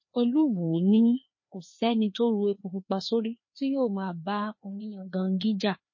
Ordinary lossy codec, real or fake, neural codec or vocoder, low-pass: MP3, 32 kbps; fake; codec, 16 kHz, 2 kbps, FreqCodec, larger model; 7.2 kHz